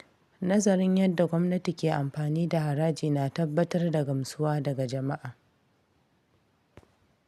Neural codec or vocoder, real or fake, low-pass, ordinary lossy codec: none; real; 14.4 kHz; none